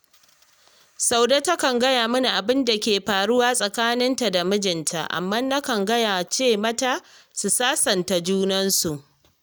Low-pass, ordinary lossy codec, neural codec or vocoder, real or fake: none; none; none; real